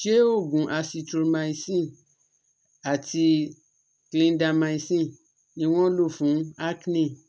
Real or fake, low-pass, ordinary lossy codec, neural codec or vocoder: real; none; none; none